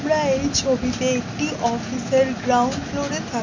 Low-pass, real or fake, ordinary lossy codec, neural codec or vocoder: 7.2 kHz; real; MP3, 64 kbps; none